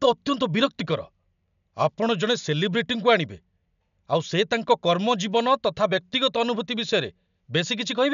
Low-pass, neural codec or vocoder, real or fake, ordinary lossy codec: 7.2 kHz; none; real; none